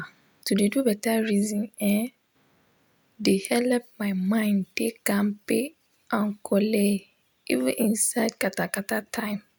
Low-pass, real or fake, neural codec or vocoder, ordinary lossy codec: none; real; none; none